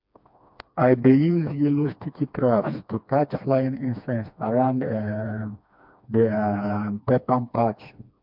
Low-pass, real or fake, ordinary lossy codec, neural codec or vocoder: 5.4 kHz; fake; MP3, 48 kbps; codec, 16 kHz, 2 kbps, FreqCodec, smaller model